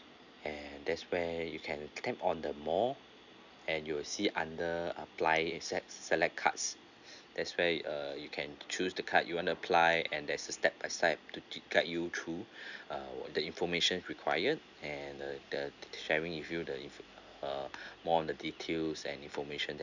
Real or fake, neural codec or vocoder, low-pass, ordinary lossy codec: real; none; 7.2 kHz; none